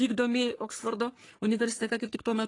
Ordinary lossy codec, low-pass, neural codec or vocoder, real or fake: AAC, 32 kbps; 10.8 kHz; codec, 44.1 kHz, 3.4 kbps, Pupu-Codec; fake